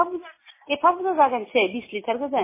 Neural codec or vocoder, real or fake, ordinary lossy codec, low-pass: none; real; MP3, 16 kbps; 3.6 kHz